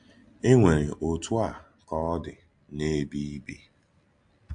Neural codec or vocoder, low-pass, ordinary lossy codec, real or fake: none; 9.9 kHz; AAC, 64 kbps; real